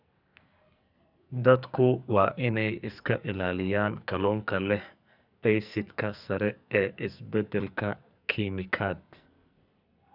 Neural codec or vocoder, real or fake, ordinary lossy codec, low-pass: codec, 44.1 kHz, 2.6 kbps, SNAC; fake; none; 5.4 kHz